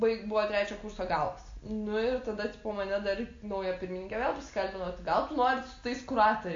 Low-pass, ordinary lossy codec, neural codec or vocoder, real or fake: 7.2 kHz; AAC, 48 kbps; none; real